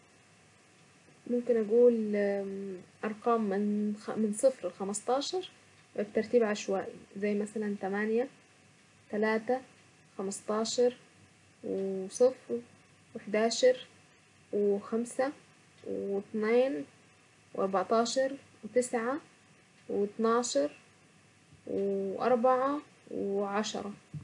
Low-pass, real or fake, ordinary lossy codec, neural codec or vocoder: 10.8 kHz; real; none; none